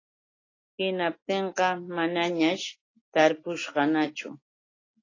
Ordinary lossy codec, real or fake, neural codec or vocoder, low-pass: AAC, 32 kbps; real; none; 7.2 kHz